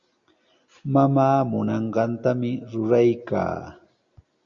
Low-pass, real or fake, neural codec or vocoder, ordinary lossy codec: 7.2 kHz; real; none; Opus, 64 kbps